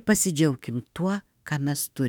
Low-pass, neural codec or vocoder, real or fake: 19.8 kHz; autoencoder, 48 kHz, 32 numbers a frame, DAC-VAE, trained on Japanese speech; fake